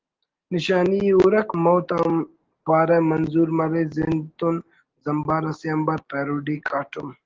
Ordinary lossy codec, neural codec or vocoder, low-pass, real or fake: Opus, 16 kbps; none; 7.2 kHz; real